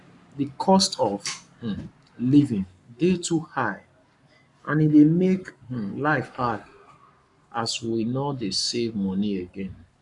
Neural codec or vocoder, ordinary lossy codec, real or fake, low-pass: codec, 44.1 kHz, 7.8 kbps, Pupu-Codec; none; fake; 10.8 kHz